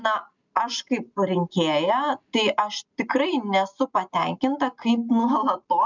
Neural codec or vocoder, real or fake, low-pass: none; real; 7.2 kHz